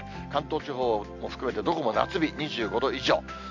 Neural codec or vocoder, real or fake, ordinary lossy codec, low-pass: none; real; MP3, 64 kbps; 7.2 kHz